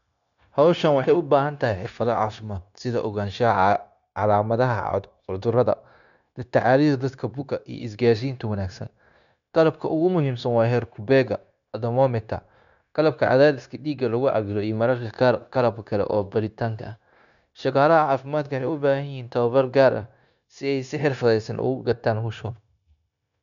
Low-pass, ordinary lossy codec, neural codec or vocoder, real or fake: 7.2 kHz; none; codec, 16 kHz, 0.9 kbps, LongCat-Audio-Codec; fake